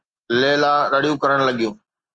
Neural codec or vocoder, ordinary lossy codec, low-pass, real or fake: none; Opus, 32 kbps; 9.9 kHz; real